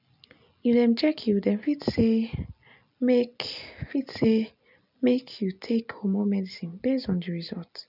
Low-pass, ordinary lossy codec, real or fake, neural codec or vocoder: 5.4 kHz; none; real; none